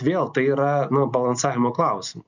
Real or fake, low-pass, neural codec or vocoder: real; 7.2 kHz; none